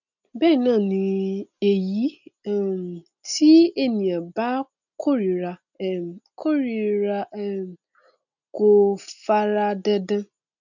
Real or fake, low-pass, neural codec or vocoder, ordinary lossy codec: real; 7.2 kHz; none; none